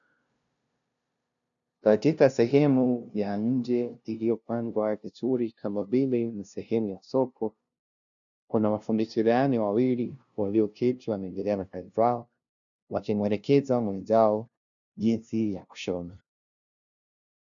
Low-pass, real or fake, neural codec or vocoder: 7.2 kHz; fake; codec, 16 kHz, 0.5 kbps, FunCodec, trained on LibriTTS, 25 frames a second